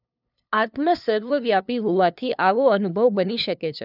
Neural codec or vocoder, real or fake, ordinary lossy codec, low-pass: codec, 16 kHz, 2 kbps, FunCodec, trained on LibriTTS, 25 frames a second; fake; none; 5.4 kHz